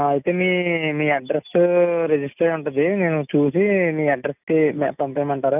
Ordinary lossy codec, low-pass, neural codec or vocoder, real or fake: none; 3.6 kHz; none; real